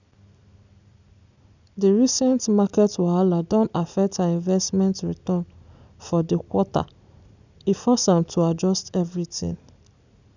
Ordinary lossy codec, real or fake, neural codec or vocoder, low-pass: none; real; none; 7.2 kHz